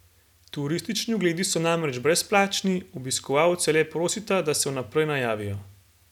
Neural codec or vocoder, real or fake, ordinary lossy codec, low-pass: none; real; none; 19.8 kHz